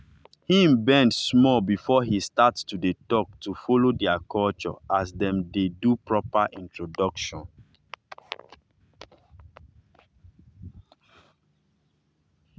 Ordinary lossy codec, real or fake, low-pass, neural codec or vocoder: none; real; none; none